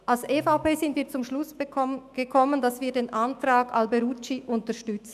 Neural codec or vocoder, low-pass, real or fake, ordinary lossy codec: autoencoder, 48 kHz, 128 numbers a frame, DAC-VAE, trained on Japanese speech; 14.4 kHz; fake; none